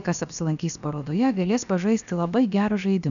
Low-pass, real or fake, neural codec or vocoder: 7.2 kHz; fake; codec, 16 kHz, about 1 kbps, DyCAST, with the encoder's durations